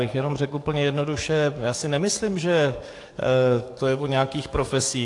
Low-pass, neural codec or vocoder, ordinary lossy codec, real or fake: 10.8 kHz; codec, 44.1 kHz, 7.8 kbps, DAC; AAC, 48 kbps; fake